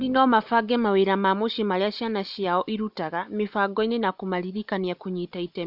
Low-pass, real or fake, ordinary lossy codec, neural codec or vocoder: 5.4 kHz; real; none; none